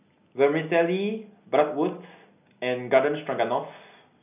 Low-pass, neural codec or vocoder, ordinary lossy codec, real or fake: 3.6 kHz; none; none; real